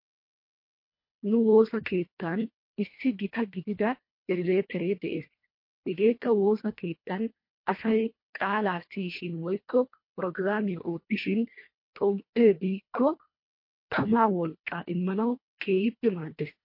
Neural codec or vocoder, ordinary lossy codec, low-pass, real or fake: codec, 24 kHz, 1.5 kbps, HILCodec; MP3, 32 kbps; 5.4 kHz; fake